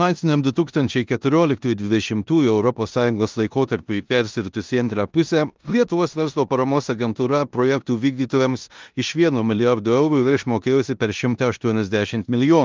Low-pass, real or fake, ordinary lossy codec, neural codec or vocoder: 7.2 kHz; fake; Opus, 24 kbps; codec, 16 kHz in and 24 kHz out, 0.9 kbps, LongCat-Audio-Codec, four codebook decoder